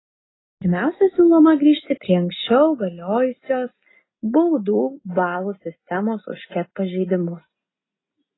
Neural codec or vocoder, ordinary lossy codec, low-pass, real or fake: none; AAC, 16 kbps; 7.2 kHz; real